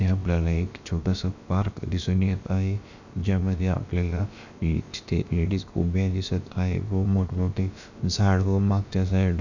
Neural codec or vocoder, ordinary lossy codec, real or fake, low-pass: codec, 16 kHz, about 1 kbps, DyCAST, with the encoder's durations; none; fake; 7.2 kHz